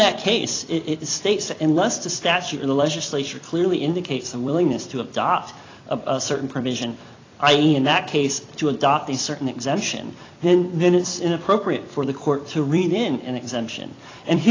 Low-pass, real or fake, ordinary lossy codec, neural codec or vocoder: 7.2 kHz; fake; AAC, 32 kbps; vocoder, 44.1 kHz, 128 mel bands every 256 samples, BigVGAN v2